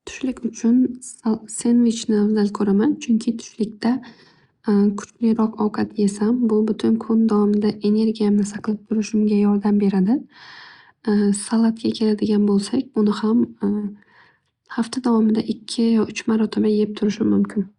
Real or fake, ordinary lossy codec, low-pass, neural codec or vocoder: real; Opus, 64 kbps; 10.8 kHz; none